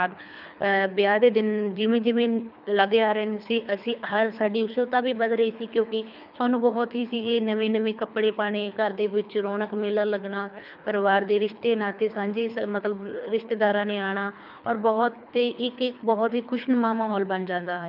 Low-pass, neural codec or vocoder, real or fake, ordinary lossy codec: 5.4 kHz; codec, 24 kHz, 3 kbps, HILCodec; fake; none